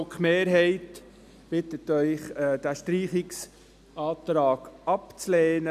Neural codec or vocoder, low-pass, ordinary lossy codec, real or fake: none; 14.4 kHz; none; real